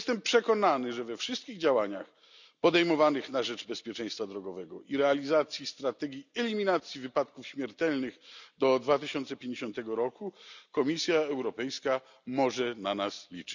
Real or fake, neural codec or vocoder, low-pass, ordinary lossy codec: real; none; 7.2 kHz; none